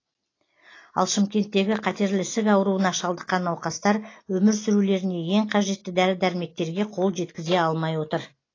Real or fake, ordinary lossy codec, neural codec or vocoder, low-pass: real; AAC, 32 kbps; none; 7.2 kHz